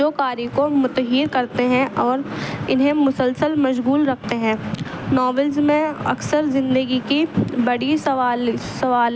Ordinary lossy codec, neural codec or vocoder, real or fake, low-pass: none; none; real; none